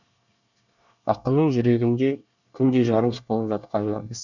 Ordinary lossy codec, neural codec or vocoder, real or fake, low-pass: none; codec, 24 kHz, 1 kbps, SNAC; fake; 7.2 kHz